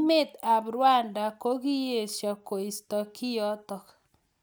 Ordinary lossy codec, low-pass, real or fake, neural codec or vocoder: none; none; real; none